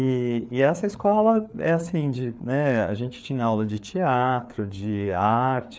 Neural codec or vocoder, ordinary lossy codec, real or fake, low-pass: codec, 16 kHz, 4 kbps, FreqCodec, larger model; none; fake; none